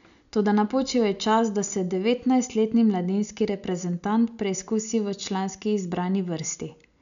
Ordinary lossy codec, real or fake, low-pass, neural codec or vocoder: none; real; 7.2 kHz; none